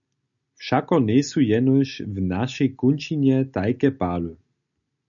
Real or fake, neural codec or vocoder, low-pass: real; none; 7.2 kHz